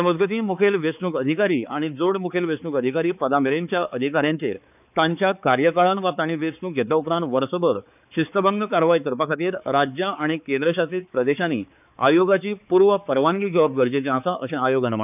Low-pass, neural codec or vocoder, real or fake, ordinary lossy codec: 3.6 kHz; codec, 16 kHz, 4 kbps, X-Codec, HuBERT features, trained on balanced general audio; fake; none